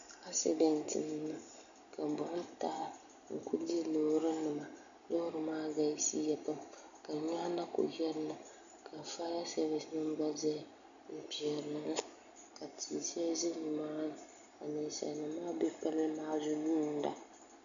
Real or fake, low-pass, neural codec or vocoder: real; 7.2 kHz; none